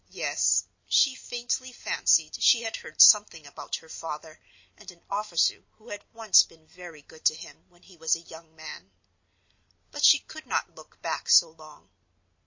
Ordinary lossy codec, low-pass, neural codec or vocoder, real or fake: MP3, 32 kbps; 7.2 kHz; none; real